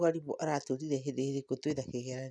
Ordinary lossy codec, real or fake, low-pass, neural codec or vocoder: none; real; none; none